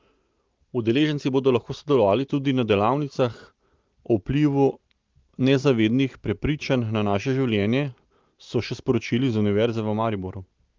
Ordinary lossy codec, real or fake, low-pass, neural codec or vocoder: Opus, 32 kbps; real; 7.2 kHz; none